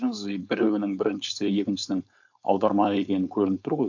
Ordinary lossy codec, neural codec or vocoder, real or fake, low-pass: none; codec, 16 kHz, 4.8 kbps, FACodec; fake; 7.2 kHz